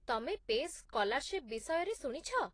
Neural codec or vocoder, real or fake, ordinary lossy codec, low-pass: none; real; AAC, 32 kbps; 9.9 kHz